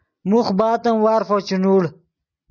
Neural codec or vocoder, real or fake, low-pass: none; real; 7.2 kHz